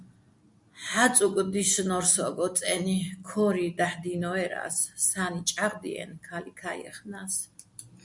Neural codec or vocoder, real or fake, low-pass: none; real; 10.8 kHz